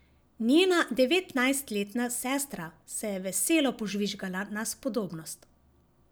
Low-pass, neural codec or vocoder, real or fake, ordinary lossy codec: none; none; real; none